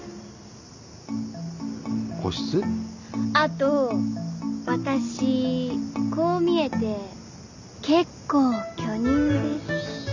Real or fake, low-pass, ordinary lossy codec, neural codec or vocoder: real; 7.2 kHz; none; none